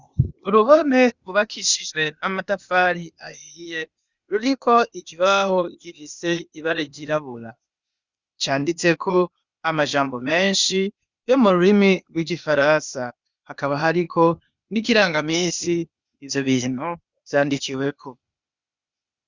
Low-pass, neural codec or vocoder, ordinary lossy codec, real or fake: 7.2 kHz; codec, 16 kHz, 0.8 kbps, ZipCodec; Opus, 64 kbps; fake